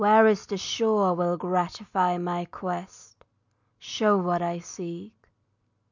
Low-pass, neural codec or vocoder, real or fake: 7.2 kHz; none; real